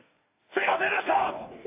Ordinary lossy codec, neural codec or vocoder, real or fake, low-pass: AAC, 24 kbps; codec, 44.1 kHz, 2.6 kbps, DAC; fake; 3.6 kHz